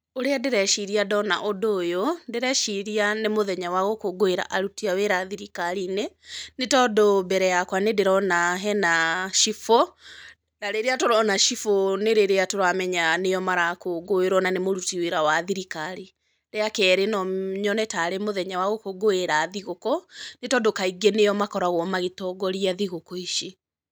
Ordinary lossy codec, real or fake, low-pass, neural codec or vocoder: none; real; none; none